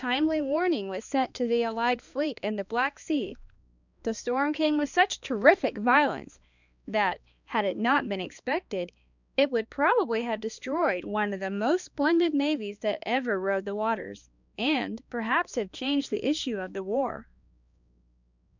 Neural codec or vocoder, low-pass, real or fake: codec, 16 kHz, 2 kbps, X-Codec, HuBERT features, trained on balanced general audio; 7.2 kHz; fake